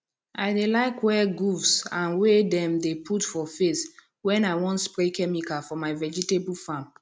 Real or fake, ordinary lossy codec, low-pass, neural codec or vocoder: real; none; none; none